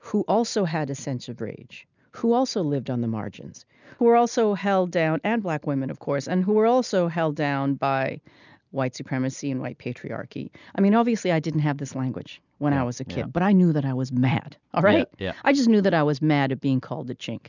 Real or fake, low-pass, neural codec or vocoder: real; 7.2 kHz; none